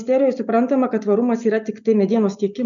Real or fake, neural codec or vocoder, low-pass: real; none; 7.2 kHz